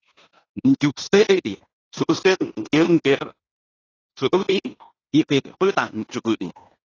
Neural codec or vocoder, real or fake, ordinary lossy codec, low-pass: codec, 16 kHz in and 24 kHz out, 0.9 kbps, LongCat-Audio-Codec, fine tuned four codebook decoder; fake; AAC, 32 kbps; 7.2 kHz